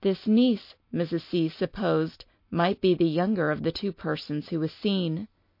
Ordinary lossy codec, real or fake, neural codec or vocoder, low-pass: MP3, 32 kbps; real; none; 5.4 kHz